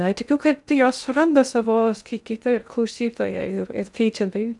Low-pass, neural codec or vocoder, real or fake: 10.8 kHz; codec, 16 kHz in and 24 kHz out, 0.6 kbps, FocalCodec, streaming, 2048 codes; fake